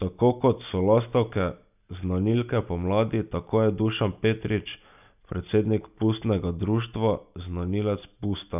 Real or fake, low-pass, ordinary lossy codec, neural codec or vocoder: real; 3.6 kHz; none; none